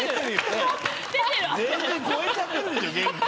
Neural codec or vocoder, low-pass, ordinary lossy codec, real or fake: none; none; none; real